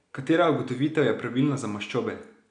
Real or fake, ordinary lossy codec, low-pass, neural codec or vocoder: real; none; 9.9 kHz; none